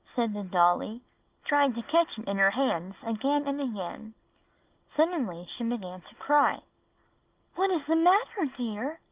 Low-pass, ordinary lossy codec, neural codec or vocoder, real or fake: 3.6 kHz; Opus, 32 kbps; none; real